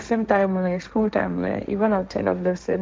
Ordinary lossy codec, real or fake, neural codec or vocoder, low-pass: none; fake; codec, 16 kHz, 1.1 kbps, Voila-Tokenizer; none